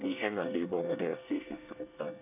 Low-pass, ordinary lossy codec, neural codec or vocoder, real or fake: 3.6 kHz; none; codec, 24 kHz, 1 kbps, SNAC; fake